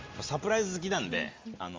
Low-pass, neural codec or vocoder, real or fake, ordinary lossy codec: 7.2 kHz; none; real; Opus, 32 kbps